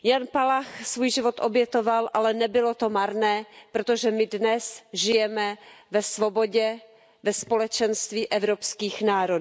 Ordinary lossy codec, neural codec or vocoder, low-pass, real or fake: none; none; none; real